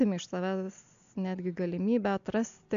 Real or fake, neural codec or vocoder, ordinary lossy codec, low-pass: real; none; AAC, 64 kbps; 7.2 kHz